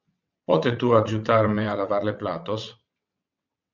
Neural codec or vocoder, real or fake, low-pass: vocoder, 22.05 kHz, 80 mel bands, WaveNeXt; fake; 7.2 kHz